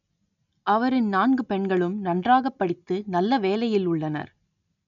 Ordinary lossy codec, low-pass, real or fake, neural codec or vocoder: none; 7.2 kHz; real; none